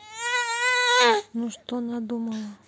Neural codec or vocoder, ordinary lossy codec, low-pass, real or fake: none; none; none; real